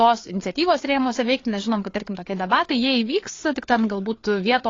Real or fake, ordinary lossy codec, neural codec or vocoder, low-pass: fake; AAC, 32 kbps; codec, 16 kHz, 4 kbps, FreqCodec, larger model; 7.2 kHz